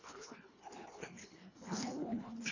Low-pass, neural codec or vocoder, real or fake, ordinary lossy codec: 7.2 kHz; codec, 24 kHz, 1.5 kbps, HILCodec; fake; AAC, 48 kbps